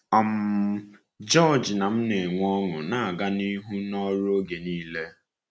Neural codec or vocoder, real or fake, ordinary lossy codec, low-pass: none; real; none; none